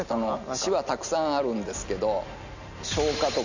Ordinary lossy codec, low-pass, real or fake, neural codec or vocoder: none; 7.2 kHz; real; none